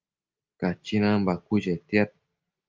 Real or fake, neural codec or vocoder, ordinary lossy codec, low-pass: real; none; Opus, 24 kbps; 7.2 kHz